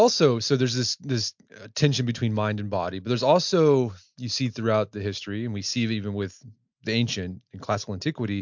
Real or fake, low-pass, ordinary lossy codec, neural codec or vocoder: real; 7.2 kHz; MP3, 64 kbps; none